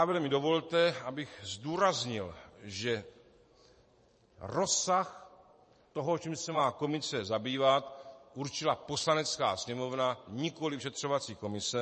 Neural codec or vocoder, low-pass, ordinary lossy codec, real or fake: vocoder, 44.1 kHz, 128 mel bands every 512 samples, BigVGAN v2; 10.8 kHz; MP3, 32 kbps; fake